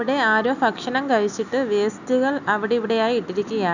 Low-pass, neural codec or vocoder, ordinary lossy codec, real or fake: 7.2 kHz; none; none; real